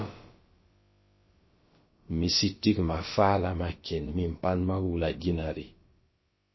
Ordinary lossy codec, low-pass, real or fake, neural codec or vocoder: MP3, 24 kbps; 7.2 kHz; fake; codec, 16 kHz, about 1 kbps, DyCAST, with the encoder's durations